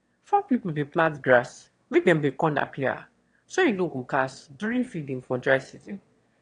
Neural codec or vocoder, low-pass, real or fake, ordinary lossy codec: autoencoder, 22.05 kHz, a latent of 192 numbers a frame, VITS, trained on one speaker; 9.9 kHz; fake; AAC, 48 kbps